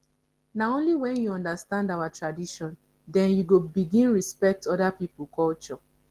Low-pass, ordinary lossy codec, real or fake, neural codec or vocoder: 14.4 kHz; Opus, 16 kbps; real; none